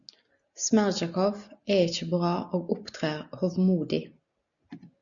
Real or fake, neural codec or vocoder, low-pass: real; none; 7.2 kHz